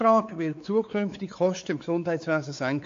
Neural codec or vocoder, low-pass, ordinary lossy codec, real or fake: codec, 16 kHz, 4 kbps, X-Codec, WavLM features, trained on Multilingual LibriSpeech; 7.2 kHz; AAC, 48 kbps; fake